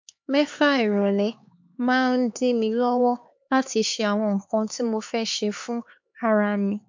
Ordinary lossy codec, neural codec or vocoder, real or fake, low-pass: MP3, 48 kbps; codec, 16 kHz, 4 kbps, X-Codec, HuBERT features, trained on LibriSpeech; fake; 7.2 kHz